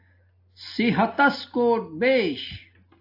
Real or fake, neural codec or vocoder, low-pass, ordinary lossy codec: real; none; 5.4 kHz; AAC, 32 kbps